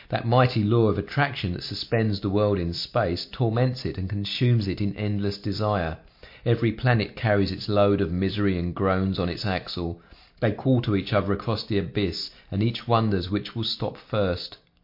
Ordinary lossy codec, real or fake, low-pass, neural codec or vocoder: MP3, 32 kbps; real; 5.4 kHz; none